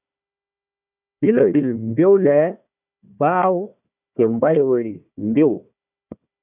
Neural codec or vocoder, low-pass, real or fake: codec, 16 kHz, 1 kbps, FunCodec, trained on Chinese and English, 50 frames a second; 3.6 kHz; fake